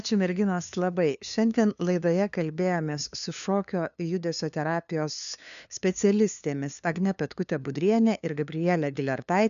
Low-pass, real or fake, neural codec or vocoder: 7.2 kHz; fake; codec, 16 kHz, 2 kbps, FunCodec, trained on LibriTTS, 25 frames a second